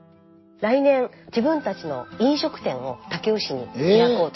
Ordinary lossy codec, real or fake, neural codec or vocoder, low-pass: MP3, 24 kbps; real; none; 7.2 kHz